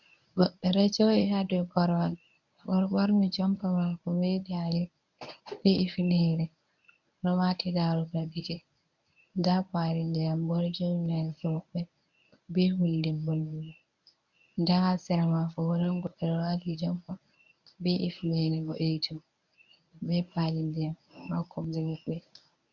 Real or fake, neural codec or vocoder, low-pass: fake; codec, 24 kHz, 0.9 kbps, WavTokenizer, medium speech release version 1; 7.2 kHz